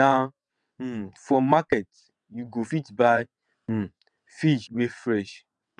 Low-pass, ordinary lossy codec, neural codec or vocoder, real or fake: 9.9 kHz; none; vocoder, 22.05 kHz, 80 mel bands, WaveNeXt; fake